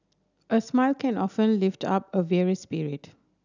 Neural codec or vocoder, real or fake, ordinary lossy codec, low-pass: none; real; none; 7.2 kHz